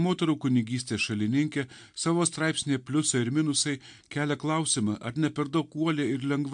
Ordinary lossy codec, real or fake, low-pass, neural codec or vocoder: MP3, 64 kbps; real; 9.9 kHz; none